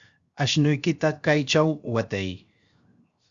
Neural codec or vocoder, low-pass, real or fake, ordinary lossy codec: codec, 16 kHz, 0.7 kbps, FocalCodec; 7.2 kHz; fake; Opus, 64 kbps